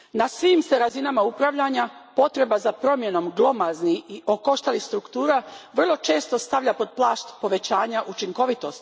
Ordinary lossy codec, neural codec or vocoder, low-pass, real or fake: none; none; none; real